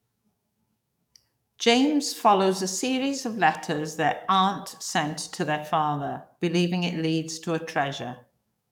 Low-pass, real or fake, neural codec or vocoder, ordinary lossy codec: 19.8 kHz; fake; codec, 44.1 kHz, 7.8 kbps, DAC; none